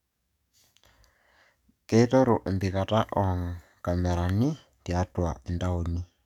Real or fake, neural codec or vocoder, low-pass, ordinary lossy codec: fake; codec, 44.1 kHz, 7.8 kbps, DAC; 19.8 kHz; none